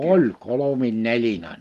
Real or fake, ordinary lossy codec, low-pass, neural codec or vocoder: real; Opus, 32 kbps; 14.4 kHz; none